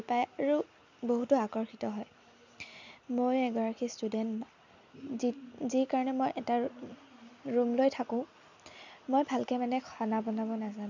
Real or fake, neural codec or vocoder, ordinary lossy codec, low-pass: real; none; none; 7.2 kHz